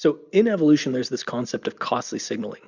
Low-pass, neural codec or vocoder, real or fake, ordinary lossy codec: 7.2 kHz; none; real; Opus, 64 kbps